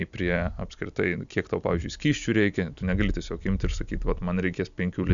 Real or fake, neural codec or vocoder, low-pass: real; none; 7.2 kHz